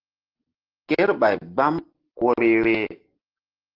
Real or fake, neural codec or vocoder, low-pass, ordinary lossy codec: real; none; 5.4 kHz; Opus, 16 kbps